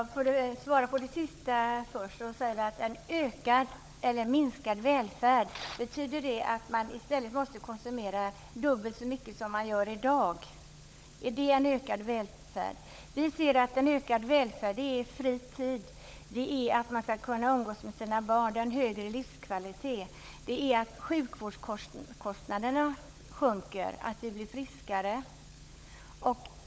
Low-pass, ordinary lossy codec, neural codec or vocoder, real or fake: none; none; codec, 16 kHz, 16 kbps, FunCodec, trained on LibriTTS, 50 frames a second; fake